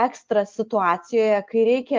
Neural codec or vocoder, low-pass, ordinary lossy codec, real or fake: none; 7.2 kHz; Opus, 24 kbps; real